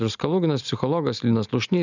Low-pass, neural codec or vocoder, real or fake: 7.2 kHz; none; real